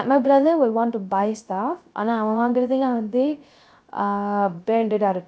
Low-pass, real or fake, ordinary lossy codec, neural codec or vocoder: none; fake; none; codec, 16 kHz, 0.3 kbps, FocalCodec